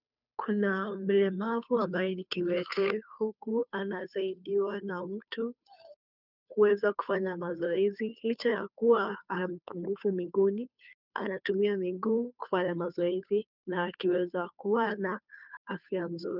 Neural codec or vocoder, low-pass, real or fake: codec, 16 kHz, 2 kbps, FunCodec, trained on Chinese and English, 25 frames a second; 5.4 kHz; fake